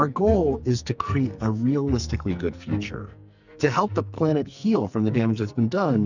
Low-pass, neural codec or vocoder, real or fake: 7.2 kHz; codec, 44.1 kHz, 2.6 kbps, SNAC; fake